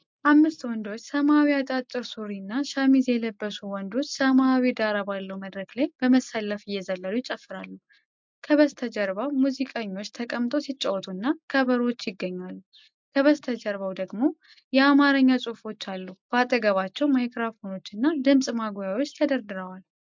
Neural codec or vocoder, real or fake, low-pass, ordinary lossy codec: none; real; 7.2 kHz; MP3, 64 kbps